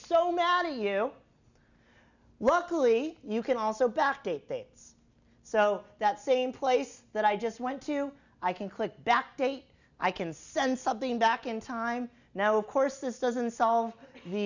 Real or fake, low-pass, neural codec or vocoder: real; 7.2 kHz; none